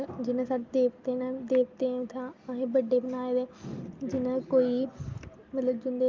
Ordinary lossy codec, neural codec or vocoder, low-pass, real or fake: Opus, 24 kbps; none; 7.2 kHz; real